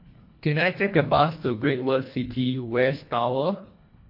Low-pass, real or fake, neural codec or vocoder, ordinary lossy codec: 5.4 kHz; fake; codec, 24 kHz, 1.5 kbps, HILCodec; MP3, 32 kbps